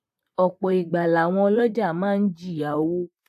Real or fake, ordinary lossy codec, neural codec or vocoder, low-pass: fake; AAC, 96 kbps; vocoder, 44.1 kHz, 128 mel bands, Pupu-Vocoder; 14.4 kHz